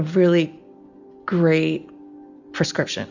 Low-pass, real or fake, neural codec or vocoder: 7.2 kHz; real; none